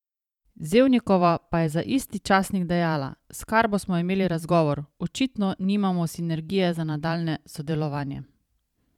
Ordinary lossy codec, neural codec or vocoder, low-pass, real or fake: none; vocoder, 44.1 kHz, 128 mel bands every 512 samples, BigVGAN v2; 19.8 kHz; fake